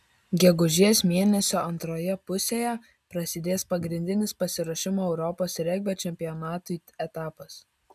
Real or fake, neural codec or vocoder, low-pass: real; none; 14.4 kHz